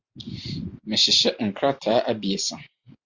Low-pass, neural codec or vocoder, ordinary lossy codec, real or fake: 7.2 kHz; none; Opus, 64 kbps; real